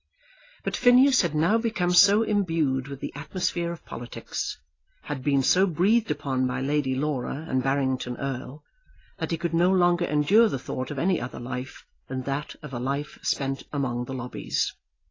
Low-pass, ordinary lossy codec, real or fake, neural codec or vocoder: 7.2 kHz; AAC, 32 kbps; real; none